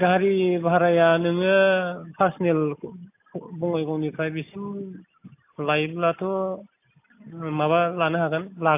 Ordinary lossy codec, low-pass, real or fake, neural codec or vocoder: AAC, 32 kbps; 3.6 kHz; real; none